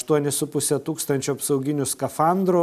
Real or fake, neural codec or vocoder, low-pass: real; none; 14.4 kHz